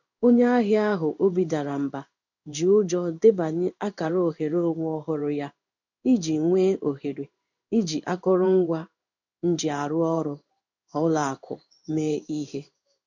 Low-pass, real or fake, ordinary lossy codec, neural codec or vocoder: 7.2 kHz; fake; none; codec, 16 kHz in and 24 kHz out, 1 kbps, XY-Tokenizer